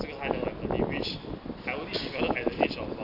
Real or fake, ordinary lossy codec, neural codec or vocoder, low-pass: real; none; none; 5.4 kHz